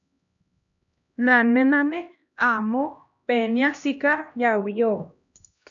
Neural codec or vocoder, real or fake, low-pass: codec, 16 kHz, 1 kbps, X-Codec, HuBERT features, trained on LibriSpeech; fake; 7.2 kHz